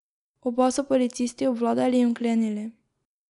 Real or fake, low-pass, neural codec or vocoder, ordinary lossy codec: real; 10.8 kHz; none; none